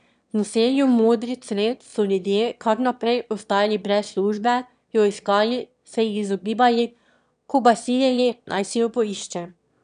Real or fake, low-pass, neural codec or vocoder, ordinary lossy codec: fake; 9.9 kHz; autoencoder, 22.05 kHz, a latent of 192 numbers a frame, VITS, trained on one speaker; none